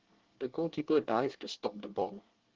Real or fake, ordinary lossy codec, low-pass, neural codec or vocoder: fake; Opus, 16 kbps; 7.2 kHz; codec, 24 kHz, 1 kbps, SNAC